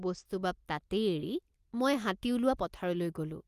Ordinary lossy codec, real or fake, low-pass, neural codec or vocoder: Opus, 24 kbps; real; 14.4 kHz; none